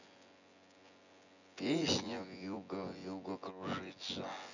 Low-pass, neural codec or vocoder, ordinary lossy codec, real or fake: 7.2 kHz; vocoder, 24 kHz, 100 mel bands, Vocos; none; fake